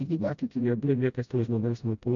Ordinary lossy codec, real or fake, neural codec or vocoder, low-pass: MP3, 64 kbps; fake; codec, 16 kHz, 0.5 kbps, FreqCodec, smaller model; 7.2 kHz